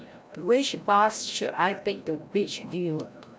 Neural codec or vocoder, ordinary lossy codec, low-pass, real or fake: codec, 16 kHz, 0.5 kbps, FreqCodec, larger model; none; none; fake